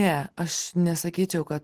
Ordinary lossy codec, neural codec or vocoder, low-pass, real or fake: Opus, 16 kbps; none; 14.4 kHz; real